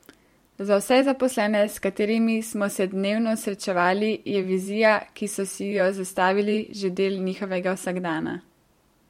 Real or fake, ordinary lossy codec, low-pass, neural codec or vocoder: fake; MP3, 64 kbps; 19.8 kHz; vocoder, 44.1 kHz, 128 mel bands every 512 samples, BigVGAN v2